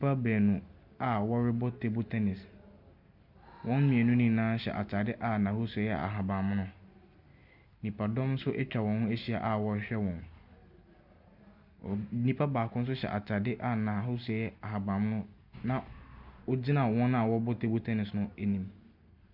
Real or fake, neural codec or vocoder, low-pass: real; none; 5.4 kHz